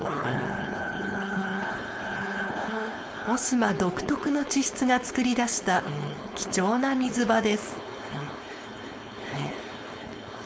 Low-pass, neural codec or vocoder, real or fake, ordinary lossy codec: none; codec, 16 kHz, 4.8 kbps, FACodec; fake; none